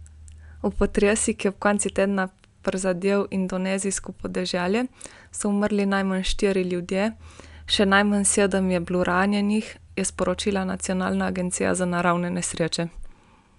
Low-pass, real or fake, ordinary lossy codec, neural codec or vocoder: 10.8 kHz; real; none; none